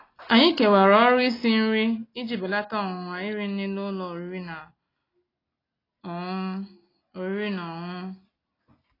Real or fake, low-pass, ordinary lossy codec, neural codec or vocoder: real; 5.4 kHz; AAC, 24 kbps; none